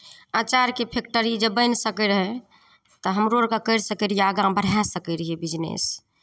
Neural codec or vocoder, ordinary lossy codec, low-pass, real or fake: none; none; none; real